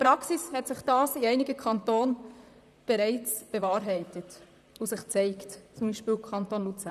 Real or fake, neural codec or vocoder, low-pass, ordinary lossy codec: fake; vocoder, 44.1 kHz, 128 mel bands, Pupu-Vocoder; 14.4 kHz; none